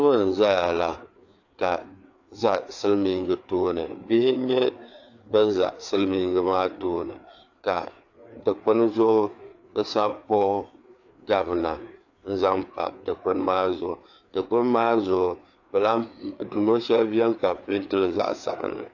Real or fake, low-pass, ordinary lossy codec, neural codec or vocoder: fake; 7.2 kHz; AAC, 48 kbps; codec, 16 kHz, 4 kbps, FreqCodec, larger model